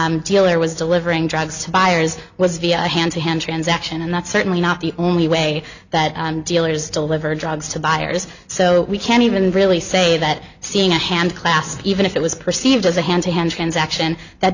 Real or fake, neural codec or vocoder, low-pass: real; none; 7.2 kHz